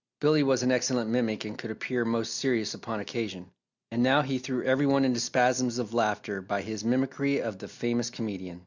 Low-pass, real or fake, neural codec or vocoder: 7.2 kHz; real; none